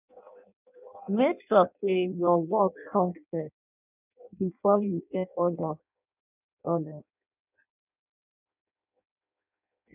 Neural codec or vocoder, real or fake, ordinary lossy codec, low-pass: codec, 16 kHz in and 24 kHz out, 0.6 kbps, FireRedTTS-2 codec; fake; none; 3.6 kHz